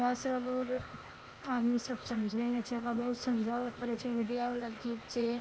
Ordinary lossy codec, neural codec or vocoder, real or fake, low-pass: none; codec, 16 kHz, 0.8 kbps, ZipCodec; fake; none